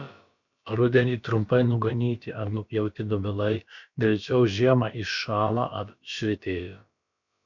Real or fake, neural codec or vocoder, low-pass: fake; codec, 16 kHz, about 1 kbps, DyCAST, with the encoder's durations; 7.2 kHz